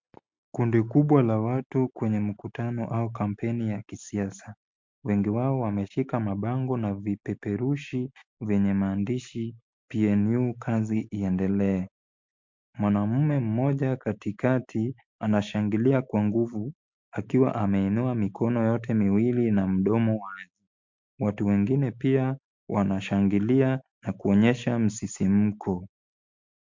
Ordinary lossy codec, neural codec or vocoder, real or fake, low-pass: MP3, 48 kbps; none; real; 7.2 kHz